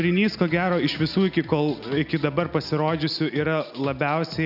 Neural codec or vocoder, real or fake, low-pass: none; real; 5.4 kHz